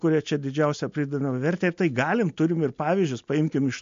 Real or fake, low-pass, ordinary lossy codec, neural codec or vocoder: real; 7.2 kHz; MP3, 48 kbps; none